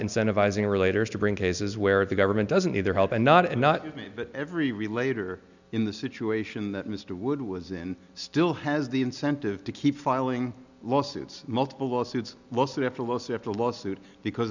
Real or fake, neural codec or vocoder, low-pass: real; none; 7.2 kHz